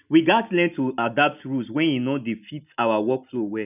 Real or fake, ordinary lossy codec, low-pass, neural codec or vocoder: real; none; 3.6 kHz; none